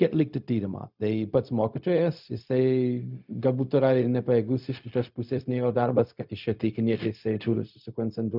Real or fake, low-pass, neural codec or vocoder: fake; 5.4 kHz; codec, 16 kHz, 0.4 kbps, LongCat-Audio-Codec